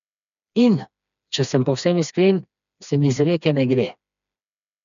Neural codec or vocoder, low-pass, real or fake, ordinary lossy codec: codec, 16 kHz, 2 kbps, FreqCodec, smaller model; 7.2 kHz; fake; MP3, 96 kbps